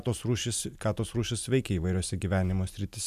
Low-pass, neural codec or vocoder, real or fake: 14.4 kHz; none; real